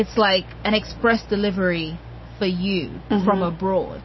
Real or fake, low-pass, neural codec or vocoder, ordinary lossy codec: real; 7.2 kHz; none; MP3, 24 kbps